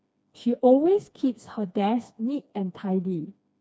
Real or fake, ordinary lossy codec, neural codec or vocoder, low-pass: fake; none; codec, 16 kHz, 2 kbps, FreqCodec, smaller model; none